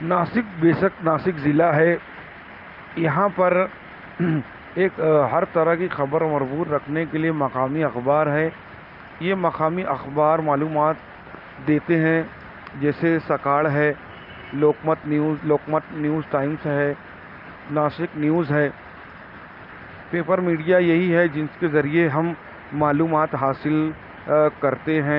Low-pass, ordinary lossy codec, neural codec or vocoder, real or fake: 5.4 kHz; Opus, 24 kbps; none; real